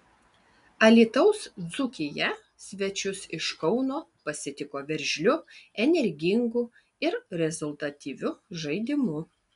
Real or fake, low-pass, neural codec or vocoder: real; 10.8 kHz; none